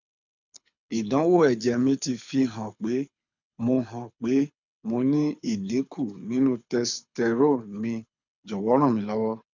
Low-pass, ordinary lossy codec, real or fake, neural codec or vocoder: 7.2 kHz; none; fake; codec, 24 kHz, 6 kbps, HILCodec